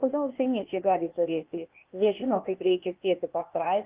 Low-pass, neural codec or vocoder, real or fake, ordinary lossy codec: 3.6 kHz; codec, 16 kHz, 0.8 kbps, ZipCodec; fake; Opus, 32 kbps